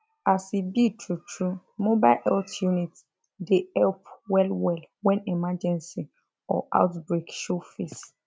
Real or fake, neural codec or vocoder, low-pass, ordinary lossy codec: real; none; none; none